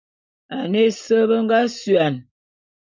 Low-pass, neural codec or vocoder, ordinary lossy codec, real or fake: 7.2 kHz; none; MP3, 64 kbps; real